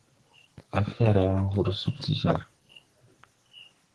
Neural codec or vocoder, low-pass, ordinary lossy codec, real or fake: codec, 44.1 kHz, 2.6 kbps, SNAC; 10.8 kHz; Opus, 16 kbps; fake